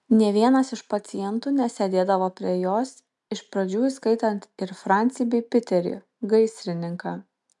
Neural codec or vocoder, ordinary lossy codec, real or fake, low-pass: none; MP3, 96 kbps; real; 10.8 kHz